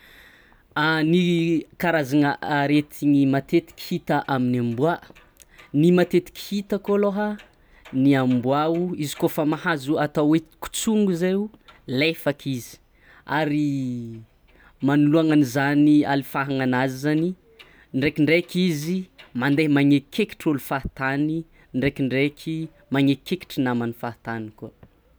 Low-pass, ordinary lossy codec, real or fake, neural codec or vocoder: none; none; real; none